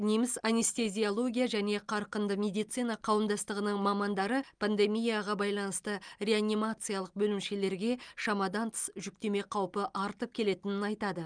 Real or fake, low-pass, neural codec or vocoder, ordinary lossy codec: real; 9.9 kHz; none; Opus, 32 kbps